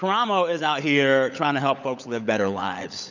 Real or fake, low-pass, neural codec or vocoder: fake; 7.2 kHz; codec, 16 kHz, 16 kbps, FunCodec, trained on LibriTTS, 50 frames a second